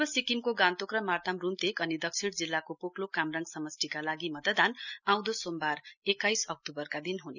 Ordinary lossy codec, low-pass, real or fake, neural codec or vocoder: none; 7.2 kHz; real; none